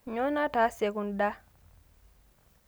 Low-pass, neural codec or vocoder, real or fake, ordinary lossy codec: none; none; real; none